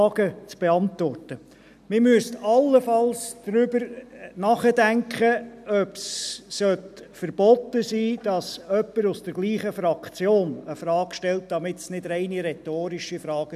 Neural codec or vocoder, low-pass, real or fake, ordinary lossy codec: none; 14.4 kHz; real; none